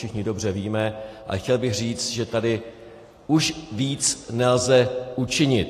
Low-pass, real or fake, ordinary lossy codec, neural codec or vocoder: 14.4 kHz; real; AAC, 48 kbps; none